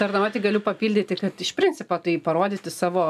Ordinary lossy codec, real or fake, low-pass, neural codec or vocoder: AAC, 96 kbps; real; 14.4 kHz; none